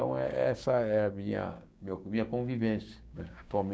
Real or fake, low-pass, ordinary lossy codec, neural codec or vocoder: fake; none; none; codec, 16 kHz, 6 kbps, DAC